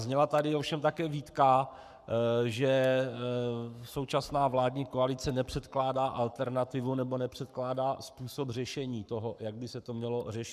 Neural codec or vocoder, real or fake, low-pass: codec, 44.1 kHz, 7.8 kbps, Pupu-Codec; fake; 14.4 kHz